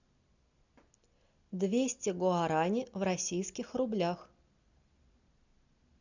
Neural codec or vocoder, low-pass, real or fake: none; 7.2 kHz; real